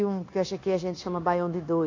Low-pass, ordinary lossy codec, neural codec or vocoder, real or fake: 7.2 kHz; AAC, 32 kbps; codec, 16 kHz, 0.9 kbps, LongCat-Audio-Codec; fake